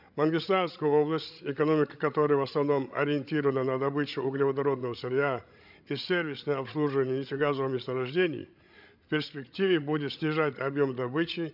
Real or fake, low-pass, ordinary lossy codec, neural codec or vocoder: fake; 5.4 kHz; none; codec, 16 kHz, 16 kbps, FreqCodec, larger model